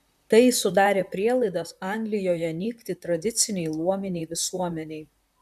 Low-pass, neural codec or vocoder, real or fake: 14.4 kHz; vocoder, 44.1 kHz, 128 mel bands, Pupu-Vocoder; fake